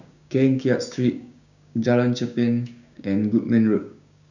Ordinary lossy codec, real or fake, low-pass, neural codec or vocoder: none; fake; 7.2 kHz; codec, 16 kHz, 6 kbps, DAC